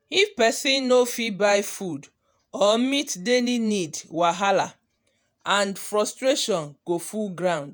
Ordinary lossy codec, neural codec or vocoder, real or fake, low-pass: none; vocoder, 48 kHz, 128 mel bands, Vocos; fake; none